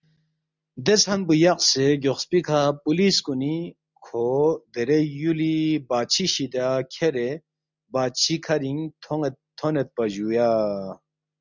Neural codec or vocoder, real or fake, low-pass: none; real; 7.2 kHz